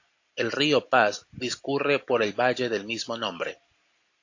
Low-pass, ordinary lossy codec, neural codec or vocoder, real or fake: 7.2 kHz; AAC, 48 kbps; none; real